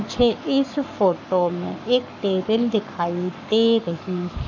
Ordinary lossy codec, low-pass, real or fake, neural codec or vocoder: none; 7.2 kHz; fake; codec, 44.1 kHz, 7.8 kbps, Pupu-Codec